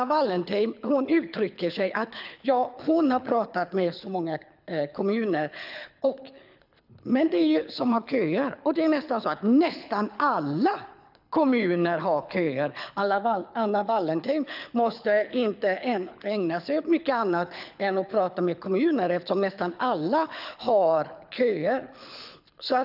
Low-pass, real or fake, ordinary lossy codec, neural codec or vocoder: 5.4 kHz; fake; none; codec, 24 kHz, 6 kbps, HILCodec